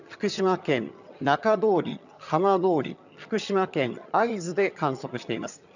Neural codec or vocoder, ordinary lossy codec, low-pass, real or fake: vocoder, 22.05 kHz, 80 mel bands, HiFi-GAN; none; 7.2 kHz; fake